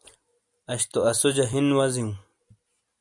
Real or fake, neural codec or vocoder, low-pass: real; none; 10.8 kHz